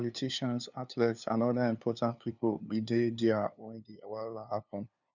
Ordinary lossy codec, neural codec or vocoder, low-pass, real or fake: none; codec, 16 kHz, 2 kbps, FunCodec, trained on LibriTTS, 25 frames a second; 7.2 kHz; fake